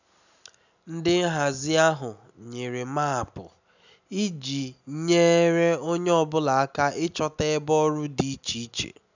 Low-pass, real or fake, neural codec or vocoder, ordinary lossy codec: 7.2 kHz; real; none; none